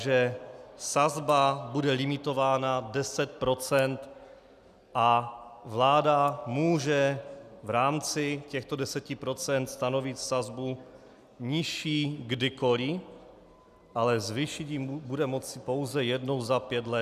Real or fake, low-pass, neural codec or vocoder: real; 14.4 kHz; none